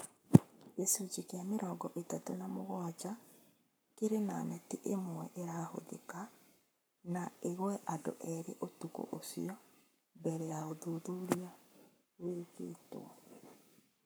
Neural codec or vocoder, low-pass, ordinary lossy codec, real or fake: codec, 44.1 kHz, 7.8 kbps, Pupu-Codec; none; none; fake